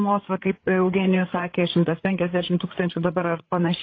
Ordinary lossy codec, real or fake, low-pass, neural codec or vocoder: AAC, 32 kbps; fake; 7.2 kHz; codec, 16 kHz, 8 kbps, FreqCodec, larger model